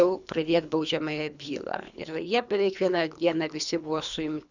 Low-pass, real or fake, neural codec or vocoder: 7.2 kHz; fake; codec, 24 kHz, 3 kbps, HILCodec